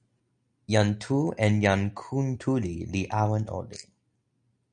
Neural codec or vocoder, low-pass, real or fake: none; 9.9 kHz; real